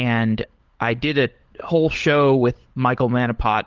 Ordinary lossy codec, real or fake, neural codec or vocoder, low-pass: Opus, 16 kbps; fake; codec, 16 kHz, 16 kbps, FunCodec, trained on LibriTTS, 50 frames a second; 7.2 kHz